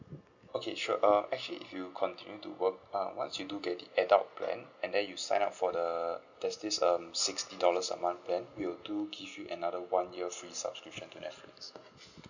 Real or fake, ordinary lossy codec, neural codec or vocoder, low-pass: real; none; none; 7.2 kHz